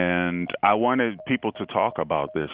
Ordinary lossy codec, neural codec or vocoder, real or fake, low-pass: Opus, 64 kbps; none; real; 5.4 kHz